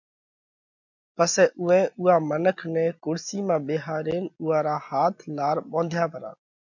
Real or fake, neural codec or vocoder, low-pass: real; none; 7.2 kHz